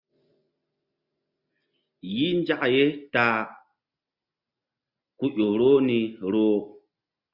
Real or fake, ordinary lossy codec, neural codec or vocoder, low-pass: real; Opus, 64 kbps; none; 5.4 kHz